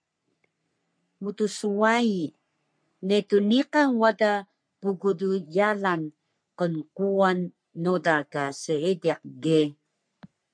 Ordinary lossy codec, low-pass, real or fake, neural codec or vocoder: MP3, 64 kbps; 9.9 kHz; fake; codec, 44.1 kHz, 3.4 kbps, Pupu-Codec